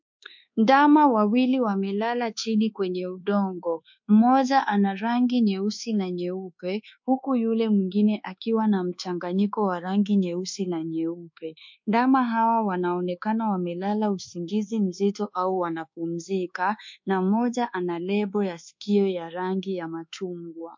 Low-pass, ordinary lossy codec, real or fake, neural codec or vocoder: 7.2 kHz; MP3, 48 kbps; fake; codec, 24 kHz, 1.2 kbps, DualCodec